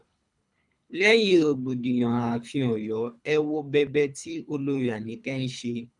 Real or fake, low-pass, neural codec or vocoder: fake; 10.8 kHz; codec, 24 kHz, 3 kbps, HILCodec